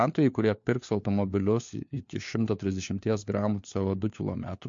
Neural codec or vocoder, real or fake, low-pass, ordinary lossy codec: codec, 16 kHz, 4 kbps, FunCodec, trained on LibriTTS, 50 frames a second; fake; 7.2 kHz; MP3, 48 kbps